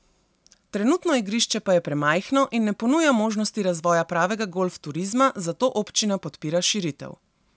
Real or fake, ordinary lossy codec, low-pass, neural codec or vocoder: real; none; none; none